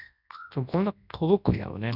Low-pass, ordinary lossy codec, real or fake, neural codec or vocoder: 5.4 kHz; none; fake; codec, 24 kHz, 0.9 kbps, WavTokenizer, large speech release